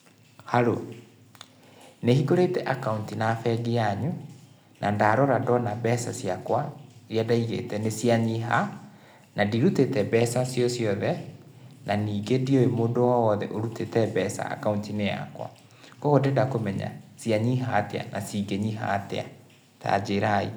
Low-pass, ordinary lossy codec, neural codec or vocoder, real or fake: none; none; none; real